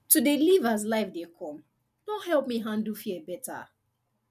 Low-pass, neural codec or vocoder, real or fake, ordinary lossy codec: 14.4 kHz; none; real; none